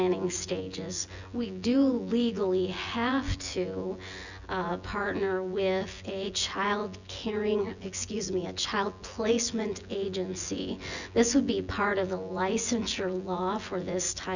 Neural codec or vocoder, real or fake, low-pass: vocoder, 24 kHz, 100 mel bands, Vocos; fake; 7.2 kHz